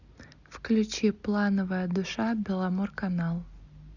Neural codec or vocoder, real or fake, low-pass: none; real; 7.2 kHz